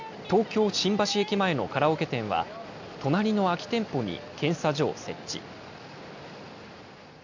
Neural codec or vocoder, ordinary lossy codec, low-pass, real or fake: none; MP3, 64 kbps; 7.2 kHz; real